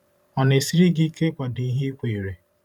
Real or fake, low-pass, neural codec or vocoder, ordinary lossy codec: fake; 19.8 kHz; vocoder, 44.1 kHz, 128 mel bands every 512 samples, BigVGAN v2; none